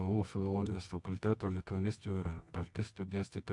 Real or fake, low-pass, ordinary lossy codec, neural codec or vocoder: fake; 10.8 kHz; AAC, 64 kbps; codec, 24 kHz, 0.9 kbps, WavTokenizer, medium music audio release